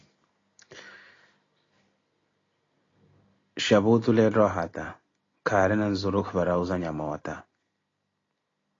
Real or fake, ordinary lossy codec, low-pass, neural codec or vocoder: real; AAC, 32 kbps; 7.2 kHz; none